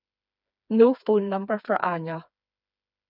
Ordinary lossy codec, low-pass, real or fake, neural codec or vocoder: none; 5.4 kHz; fake; codec, 16 kHz, 4 kbps, FreqCodec, smaller model